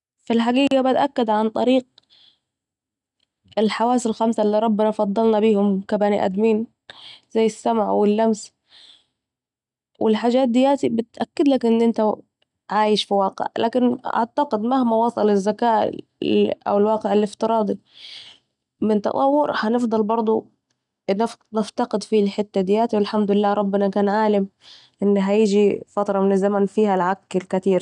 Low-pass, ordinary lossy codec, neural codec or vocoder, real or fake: 10.8 kHz; none; none; real